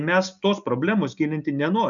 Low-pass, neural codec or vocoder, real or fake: 7.2 kHz; none; real